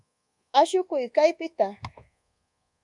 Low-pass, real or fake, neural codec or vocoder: 10.8 kHz; fake; codec, 24 kHz, 1.2 kbps, DualCodec